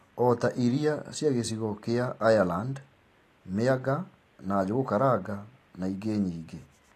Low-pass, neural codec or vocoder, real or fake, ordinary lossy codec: 14.4 kHz; none; real; AAC, 48 kbps